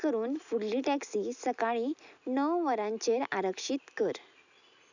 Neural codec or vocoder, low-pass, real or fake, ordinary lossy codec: none; 7.2 kHz; real; none